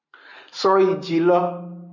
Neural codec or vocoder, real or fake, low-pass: none; real; 7.2 kHz